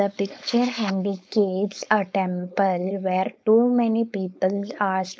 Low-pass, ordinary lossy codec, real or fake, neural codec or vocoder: none; none; fake; codec, 16 kHz, 4.8 kbps, FACodec